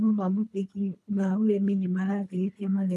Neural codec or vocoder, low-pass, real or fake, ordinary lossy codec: codec, 24 kHz, 3 kbps, HILCodec; none; fake; none